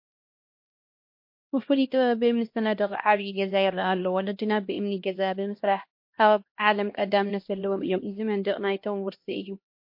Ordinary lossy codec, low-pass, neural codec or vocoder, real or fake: MP3, 32 kbps; 5.4 kHz; codec, 16 kHz, 1 kbps, X-Codec, HuBERT features, trained on LibriSpeech; fake